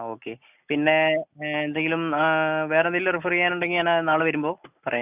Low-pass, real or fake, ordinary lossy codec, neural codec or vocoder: 3.6 kHz; real; none; none